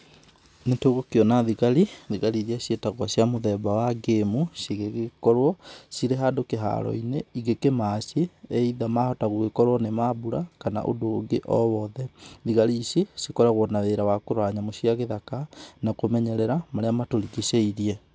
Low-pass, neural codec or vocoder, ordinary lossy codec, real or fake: none; none; none; real